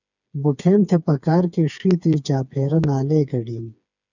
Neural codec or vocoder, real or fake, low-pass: codec, 16 kHz, 4 kbps, FreqCodec, smaller model; fake; 7.2 kHz